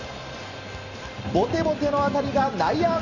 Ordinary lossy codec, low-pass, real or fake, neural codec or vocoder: none; 7.2 kHz; real; none